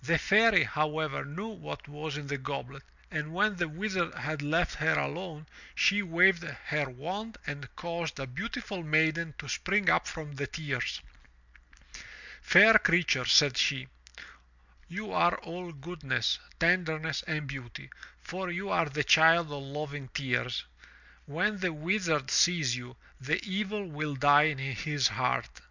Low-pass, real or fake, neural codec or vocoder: 7.2 kHz; real; none